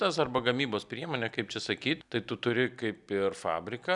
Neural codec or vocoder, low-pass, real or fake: none; 10.8 kHz; real